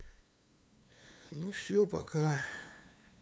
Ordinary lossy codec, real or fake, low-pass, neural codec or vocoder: none; fake; none; codec, 16 kHz, 2 kbps, FunCodec, trained on LibriTTS, 25 frames a second